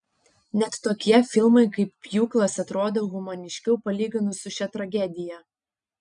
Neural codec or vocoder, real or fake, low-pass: none; real; 9.9 kHz